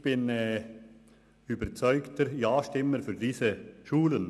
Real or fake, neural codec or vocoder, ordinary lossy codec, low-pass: real; none; none; none